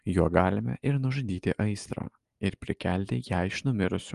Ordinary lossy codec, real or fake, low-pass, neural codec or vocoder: Opus, 24 kbps; real; 10.8 kHz; none